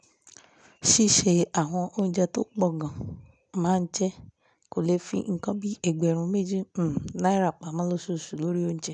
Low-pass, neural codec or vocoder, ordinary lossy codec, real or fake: 9.9 kHz; none; none; real